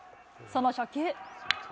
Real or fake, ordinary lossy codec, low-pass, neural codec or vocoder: real; none; none; none